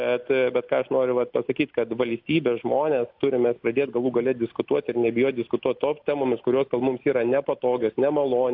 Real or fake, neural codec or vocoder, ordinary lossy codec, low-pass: real; none; AAC, 48 kbps; 5.4 kHz